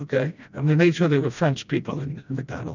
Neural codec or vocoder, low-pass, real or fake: codec, 16 kHz, 1 kbps, FreqCodec, smaller model; 7.2 kHz; fake